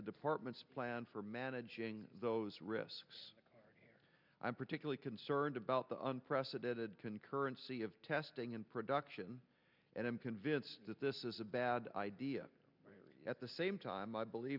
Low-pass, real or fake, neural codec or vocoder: 5.4 kHz; real; none